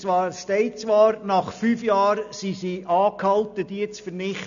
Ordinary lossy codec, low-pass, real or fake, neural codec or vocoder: none; 7.2 kHz; real; none